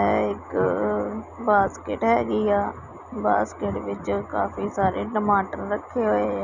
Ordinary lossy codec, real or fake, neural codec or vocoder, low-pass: none; real; none; 7.2 kHz